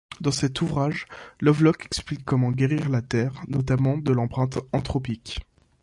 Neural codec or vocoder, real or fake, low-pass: none; real; 10.8 kHz